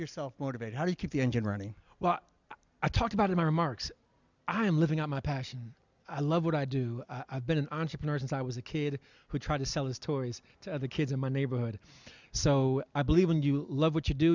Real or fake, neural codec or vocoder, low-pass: real; none; 7.2 kHz